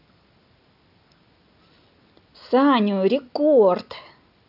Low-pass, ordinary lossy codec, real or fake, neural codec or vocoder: 5.4 kHz; none; real; none